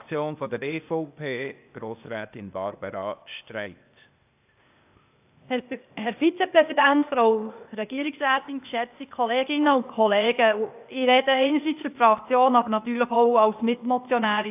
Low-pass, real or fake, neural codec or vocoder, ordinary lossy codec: 3.6 kHz; fake; codec, 16 kHz, 0.8 kbps, ZipCodec; AAC, 32 kbps